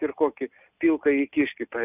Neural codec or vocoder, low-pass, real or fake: none; 3.6 kHz; real